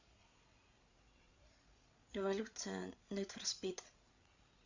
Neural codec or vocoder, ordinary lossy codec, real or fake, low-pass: codec, 16 kHz, 8 kbps, FreqCodec, larger model; Opus, 64 kbps; fake; 7.2 kHz